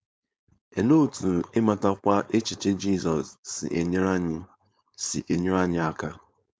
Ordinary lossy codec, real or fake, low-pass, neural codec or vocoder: none; fake; none; codec, 16 kHz, 4.8 kbps, FACodec